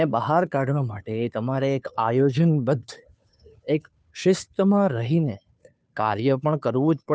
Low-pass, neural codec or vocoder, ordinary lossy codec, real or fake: none; codec, 16 kHz, 2 kbps, FunCodec, trained on Chinese and English, 25 frames a second; none; fake